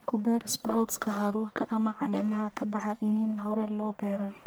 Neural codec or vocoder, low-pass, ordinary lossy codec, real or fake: codec, 44.1 kHz, 1.7 kbps, Pupu-Codec; none; none; fake